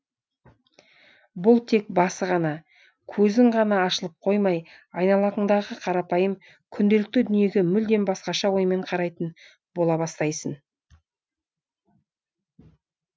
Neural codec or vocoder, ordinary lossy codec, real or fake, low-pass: none; none; real; none